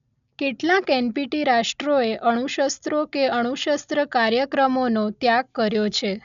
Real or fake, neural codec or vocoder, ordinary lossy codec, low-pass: real; none; MP3, 96 kbps; 7.2 kHz